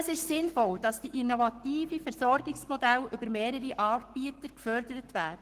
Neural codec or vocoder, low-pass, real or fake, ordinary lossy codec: codec, 44.1 kHz, 7.8 kbps, Pupu-Codec; 14.4 kHz; fake; Opus, 16 kbps